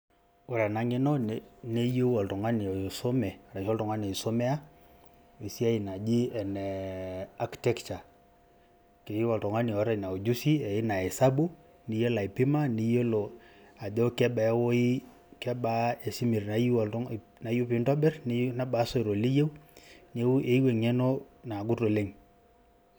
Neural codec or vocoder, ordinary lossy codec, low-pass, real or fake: none; none; none; real